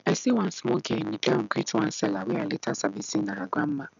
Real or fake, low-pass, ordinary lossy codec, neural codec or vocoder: real; 7.2 kHz; none; none